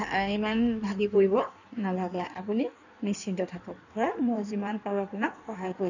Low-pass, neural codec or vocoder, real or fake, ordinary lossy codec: 7.2 kHz; codec, 16 kHz in and 24 kHz out, 1.1 kbps, FireRedTTS-2 codec; fake; none